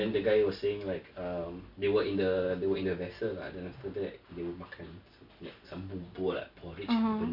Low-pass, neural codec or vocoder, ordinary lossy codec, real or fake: 5.4 kHz; none; MP3, 48 kbps; real